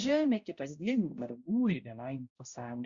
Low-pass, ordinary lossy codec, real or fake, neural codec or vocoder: 7.2 kHz; Opus, 64 kbps; fake; codec, 16 kHz, 0.5 kbps, X-Codec, HuBERT features, trained on balanced general audio